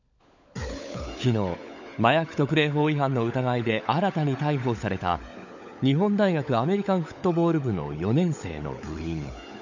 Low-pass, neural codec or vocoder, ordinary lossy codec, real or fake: 7.2 kHz; codec, 16 kHz, 16 kbps, FunCodec, trained on LibriTTS, 50 frames a second; none; fake